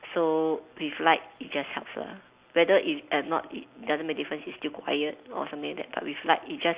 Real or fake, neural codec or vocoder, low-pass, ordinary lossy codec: real; none; 3.6 kHz; Opus, 64 kbps